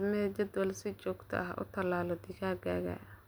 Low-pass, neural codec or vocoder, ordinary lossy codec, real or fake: none; none; none; real